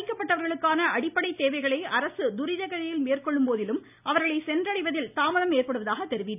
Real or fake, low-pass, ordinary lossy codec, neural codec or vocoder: real; 3.6 kHz; none; none